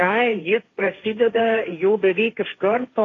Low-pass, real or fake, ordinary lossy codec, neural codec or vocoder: 7.2 kHz; fake; AAC, 32 kbps; codec, 16 kHz, 1.1 kbps, Voila-Tokenizer